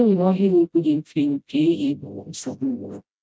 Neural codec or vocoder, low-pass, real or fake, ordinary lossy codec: codec, 16 kHz, 0.5 kbps, FreqCodec, smaller model; none; fake; none